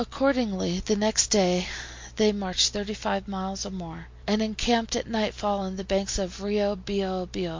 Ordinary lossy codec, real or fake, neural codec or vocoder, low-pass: MP3, 48 kbps; real; none; 7.2 kHz